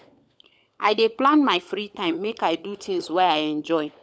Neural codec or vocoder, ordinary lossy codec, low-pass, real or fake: codec, 16 kHz, 16 kbps, FunCodec, trained on LibriTTS, 50 frames a second; none; none; fake